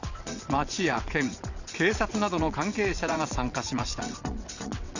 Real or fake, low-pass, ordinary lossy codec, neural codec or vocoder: fake; 7.2 kHz; none; vocoder, 22.05 kHz, 80 mel bands, WaveNeXt